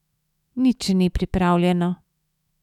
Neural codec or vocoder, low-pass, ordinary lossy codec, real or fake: autoencoder, 48 kHz, 128 numbers a frame, DAC-VAE, trained on Japanese speech; 19.8 kHz; none; fake